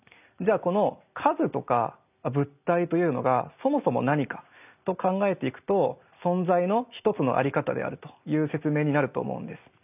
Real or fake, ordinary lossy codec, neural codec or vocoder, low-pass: real; none; none; 3.6 kHz